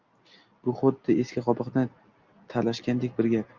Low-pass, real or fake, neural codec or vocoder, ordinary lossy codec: 7.2 kHz; real; none; Opus, 24 kbps